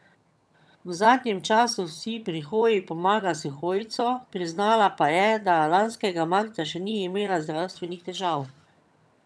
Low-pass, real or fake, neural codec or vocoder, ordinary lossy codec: none; fake; vocoder, 22.05 kHz, 80 mel bands, HiFi-GAN; none